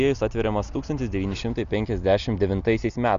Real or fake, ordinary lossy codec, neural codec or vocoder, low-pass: real; Opus, 64 kbps; none; 7.2 kHz